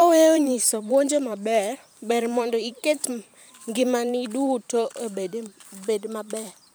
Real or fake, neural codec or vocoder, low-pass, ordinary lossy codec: fake; vocoder, 44.1 kHz, 128 mel bands every 512 samples, BigVGAN v2; none; none